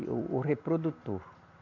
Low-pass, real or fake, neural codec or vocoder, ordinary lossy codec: 7.2 kHz; real; none; none